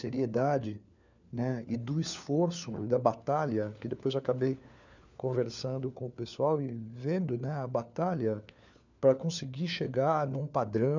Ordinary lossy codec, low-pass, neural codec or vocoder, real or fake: none; 7.2 kHz; codec, 16 kHz, 4 kbps, FunCodec, trained on LibriTTS, 50 frames a second; fake